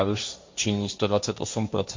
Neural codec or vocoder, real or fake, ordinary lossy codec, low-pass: codec, 16 kHz, 1.1 kbps, Voila-Tokenizer; fake; MP3, 48 kbps; 7.2 kHz